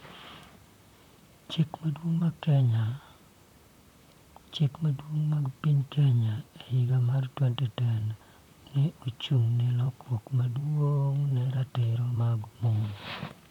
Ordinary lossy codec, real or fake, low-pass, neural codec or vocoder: none; fake; 19.8 kHz; vocoder, 44.1 kHz, 128 mel bands, Pupu-Vocoder